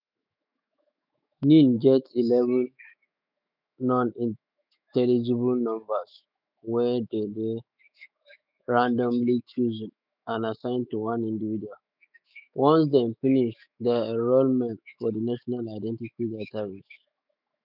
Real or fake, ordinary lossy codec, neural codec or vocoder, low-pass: fake; none; autoencoder, 48 kHz, 128 numbers a frame, DAC-VAE, trained on Japanese speech; 5.4 kHz